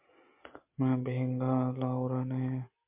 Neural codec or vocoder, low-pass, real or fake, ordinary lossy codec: none; 3.6 kHz; real; MP3, 32 kbps